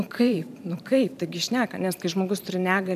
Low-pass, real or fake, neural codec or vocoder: 14.4 kHz; fake; vocoder, 44.1 kHz, 128 mel bands every 512 samples, BigVGAN v2